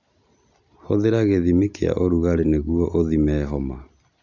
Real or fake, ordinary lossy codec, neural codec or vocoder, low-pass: real; none; none; 7.2 kHz